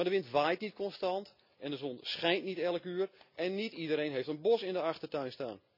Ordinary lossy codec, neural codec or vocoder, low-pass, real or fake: none; none; 5.4 kHz; real